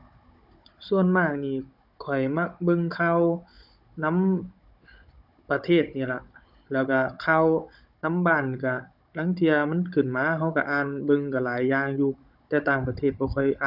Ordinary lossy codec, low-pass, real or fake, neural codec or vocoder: none; 5.4 kHz; fake; codec, 16 kHz, 16 kbps, FunCodec, trained on Chinese and English, 50 frames a second